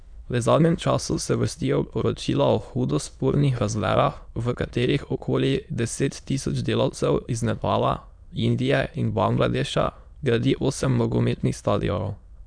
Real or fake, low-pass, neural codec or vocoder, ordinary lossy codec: fake; 9.9 kHz; autoencoder, 22.05 kHz, a latent of 192 numbers a frame, VITS, trained on many speakers; none